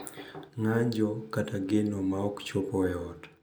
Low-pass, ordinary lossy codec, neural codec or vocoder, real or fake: none; none; none; real